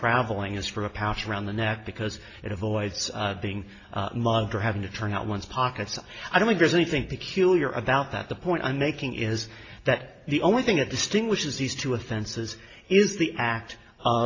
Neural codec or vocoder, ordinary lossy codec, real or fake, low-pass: none; AAC, 32 kbps; real; 7.2 kHz